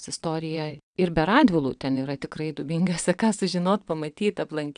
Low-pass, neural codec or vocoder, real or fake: 9.9 kHz; vocoder, 22.05 kHz, 80 mel bands, WaveNeXt; fake